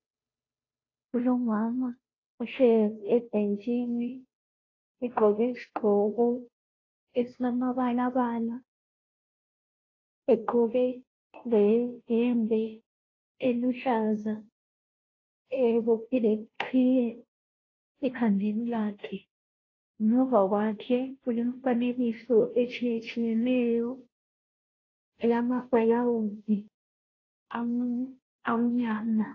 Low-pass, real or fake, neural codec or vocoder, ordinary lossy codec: 7.2 kHz; fake; codec, 16 kHz, 0.5 kbps, FunCodec, trained on Chinese and English, 25 frames a second; AAC, 32 kbps